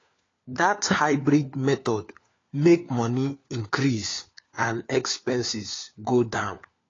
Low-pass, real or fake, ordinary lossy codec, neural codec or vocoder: 7.2 kHz; fake; AAC, 32 kbps; codec, 16 kHz, 4 kbps, FunCodec, trained on LibriTTS, 50 frames a second